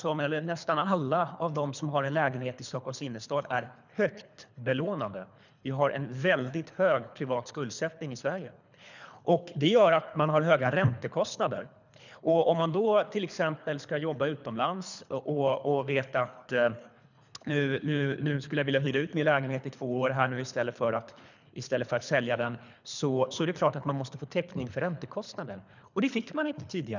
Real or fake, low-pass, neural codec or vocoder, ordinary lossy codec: fake; 7.2 kHz; codec, 24 kHz, 3 kbps, HILCodec; none